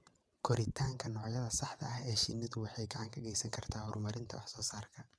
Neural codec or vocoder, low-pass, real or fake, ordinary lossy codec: vocoder, 22.05 kHz, 80 mel bands, WaveNeXt; none; fake; none